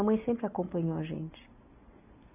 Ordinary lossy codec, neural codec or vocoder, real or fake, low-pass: none; none; real; 3.6 kHz